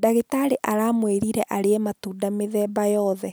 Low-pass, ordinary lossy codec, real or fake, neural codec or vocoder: none; none; real; none